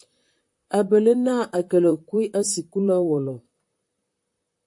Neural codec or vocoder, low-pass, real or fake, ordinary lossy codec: vocoder, 44.1 kHz, 128 mel bands, Pupu-Vocoder; 10.8 kHz; fake; MP3, 48 kbps